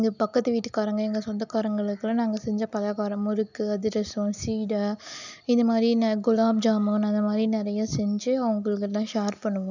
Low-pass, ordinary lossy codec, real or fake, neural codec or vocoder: 7.2 kHz; none; real; none